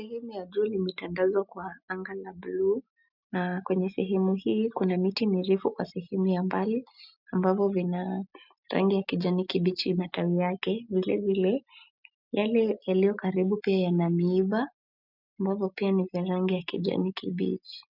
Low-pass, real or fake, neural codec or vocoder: 5.4 kHz; real; none